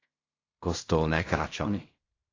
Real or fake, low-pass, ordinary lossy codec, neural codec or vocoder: fake; 7.2 kHz; AAC, 32 kbps; codec, 16 kHz in and 24 kHz out, 0.4 kbps, LongCat-Audio-Codec, fine tuned four codebook decoder